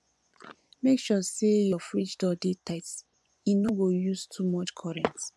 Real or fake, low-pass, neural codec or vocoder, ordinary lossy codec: fake; none; vocoder, 24 kHz, 100 mel bands, Vocos; none